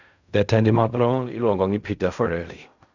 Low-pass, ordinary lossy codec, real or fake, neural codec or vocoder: 7.2 kHz; none; fake; codec, 16 kHz in and 24 kHz out, 0.4 kbps, LongCat-Audio-Codec, fine tuned four codebook decoder